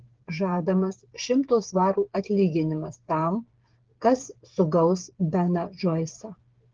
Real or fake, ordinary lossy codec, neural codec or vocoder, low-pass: fake; Opus, 16 kbps; codec, 16 kHz, 8 kbps, FreqCodec, smaller model; 7.2 kHz